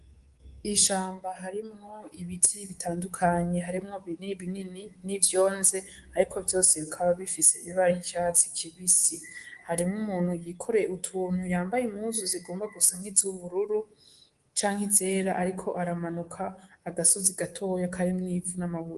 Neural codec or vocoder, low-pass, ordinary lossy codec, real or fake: codec, 24 kHz, 3.1 kbps, DualCodec; 10.8 kHz; Opus, 24 kbps; fake